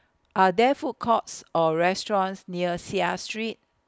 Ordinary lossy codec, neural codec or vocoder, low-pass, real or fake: none; none; none; real